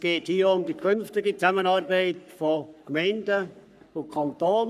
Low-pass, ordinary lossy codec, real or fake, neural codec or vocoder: 14.4 kHz; none; fake; codec, 44.1 kHz, 3.4 kbps, Pupu-Codec